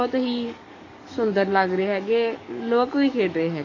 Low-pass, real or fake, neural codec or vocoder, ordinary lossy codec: 7.2 kHz; fake; codec, 44.1 kHz, 7.8 kbps, DAC; AAC, 32 kbps